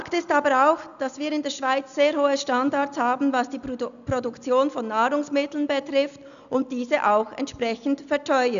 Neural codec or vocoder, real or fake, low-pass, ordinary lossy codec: none; real; 7.2 kHz; none